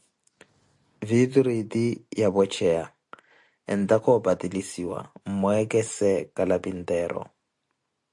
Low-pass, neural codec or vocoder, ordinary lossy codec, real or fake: 10.8 kHz; none; AAC, 64 kbps; real